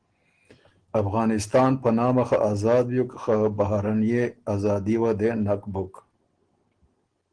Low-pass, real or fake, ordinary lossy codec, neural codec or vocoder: 9.9 kHz; real; Opus, 16 kbps; none